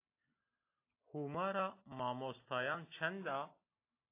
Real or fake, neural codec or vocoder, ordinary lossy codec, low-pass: real; none; AAC, 24 kbps; 3.6 kHz